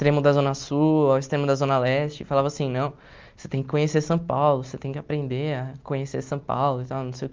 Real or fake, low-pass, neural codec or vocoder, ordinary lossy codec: real; 7.2 kHz; none; Opus, 32 kbps